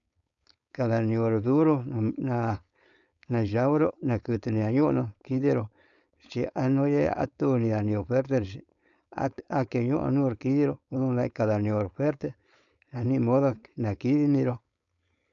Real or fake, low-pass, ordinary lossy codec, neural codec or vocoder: fake; 7.2 kHz; none; codec, 16 kHz, 4.8 kbps, FACodec